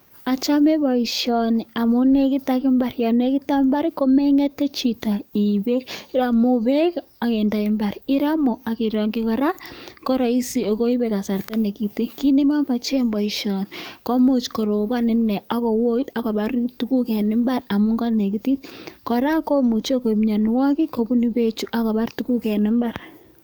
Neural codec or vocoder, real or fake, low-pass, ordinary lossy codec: codec, 44.1 kHz, 7.8 kbps, DAC; fake; none; none